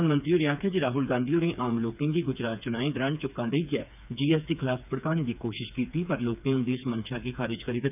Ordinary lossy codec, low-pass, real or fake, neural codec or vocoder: none; 3.6 kHz; fake; codec, 16 kHz, 4 kbps, FreqCodec, smaller model